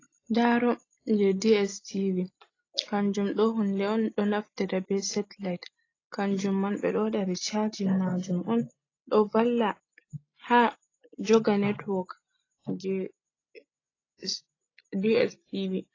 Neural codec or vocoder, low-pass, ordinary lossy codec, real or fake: none; 7.2 kHz; AAC, 32 kbps; real